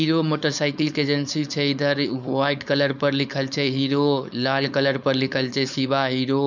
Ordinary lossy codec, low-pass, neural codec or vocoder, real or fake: none; 7.2 kHz; codec, 16 kHz, 4.8 kbps, FACodec; fake